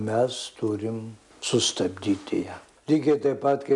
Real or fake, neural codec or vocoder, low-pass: real; none; 10.8 kHz